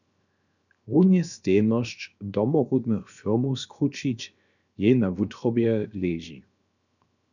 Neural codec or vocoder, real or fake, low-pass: codec, 16 kHz, 0.7 kbps, FocalCodec; fake; 7.2 kHz